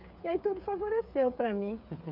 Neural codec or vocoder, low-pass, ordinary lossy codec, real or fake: codec, 16 kHz, 8 kbps, FreqCodec, smaller model; 5.4 kHz; none; fake